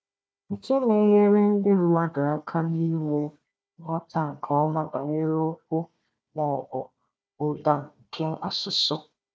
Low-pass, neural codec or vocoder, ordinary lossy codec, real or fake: none; codec, 16 kHz, 1 kbps, FunCodec, trained on Chinese and English, 50 frames a second; none; fake